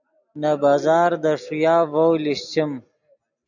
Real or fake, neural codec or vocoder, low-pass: real; none; 7.2 kHz